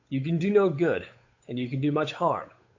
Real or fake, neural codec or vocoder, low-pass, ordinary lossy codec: fake; codec, 16 kHz, 8 kbps, FunCodec, trained on Chinese and English, 25 frames a second; 7.2 kHz; AAC, 48 kbps